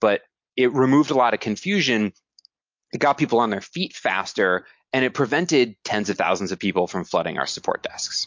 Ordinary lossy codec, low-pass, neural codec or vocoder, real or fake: MP3, 48 kbps; 7.2 kHz; none; real